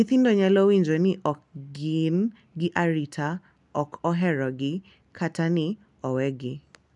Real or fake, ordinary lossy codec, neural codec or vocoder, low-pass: real; none; none; 10.8 kHz